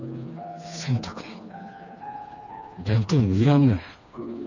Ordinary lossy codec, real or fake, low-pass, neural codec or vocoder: none; fake; 7.2 kHz; codec, 16 kHz, 2 kbps, FreqCodec, smaller model